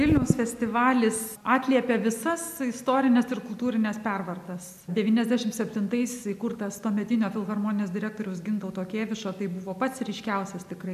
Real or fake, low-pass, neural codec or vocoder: real; 14.4 kHz; none